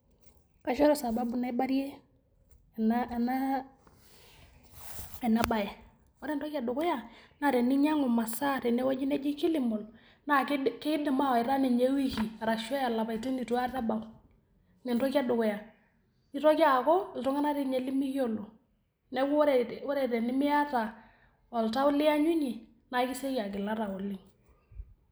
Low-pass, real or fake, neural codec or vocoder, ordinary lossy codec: none; real; none; none